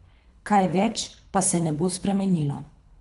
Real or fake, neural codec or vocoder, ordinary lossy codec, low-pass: fake; codec, 24 kHz, 3 kbps, HILCodec; none; 10.8 kHz